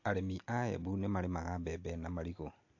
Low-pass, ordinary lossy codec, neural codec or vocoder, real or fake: 7.2 kHz; AAC, 48 kbps; none; real